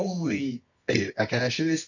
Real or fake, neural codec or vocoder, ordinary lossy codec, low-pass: fake; codec, 24 kHz, 0.9 kbps, WavTokenizer, medium music audio release; none; 7.2 kHz